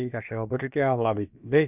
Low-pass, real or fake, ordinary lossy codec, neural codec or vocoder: 3.6 kHz; fake; none; codec, 16 kHz, about 1 kbps, DyCAST, with the encoder's durations